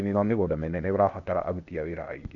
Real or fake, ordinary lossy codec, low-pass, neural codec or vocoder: fake; none; 7.2 kHz; codec, 16 kHz, 0.8 kbps, ZipCodec